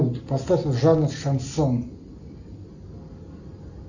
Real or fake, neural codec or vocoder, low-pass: real; none; 7.2 kHz